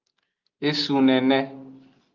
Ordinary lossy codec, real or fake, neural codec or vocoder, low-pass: Opus, 24 kbps; real; none; 7.2 kHz